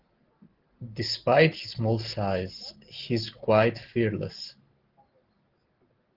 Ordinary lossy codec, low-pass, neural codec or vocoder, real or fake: Opus, 16 kbps; 5.4 kHz; none; real